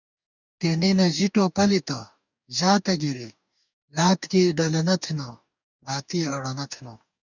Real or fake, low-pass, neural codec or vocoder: fake; 7.2 kHz; codec, 44.1 kHz, 2.6 kbps, DAC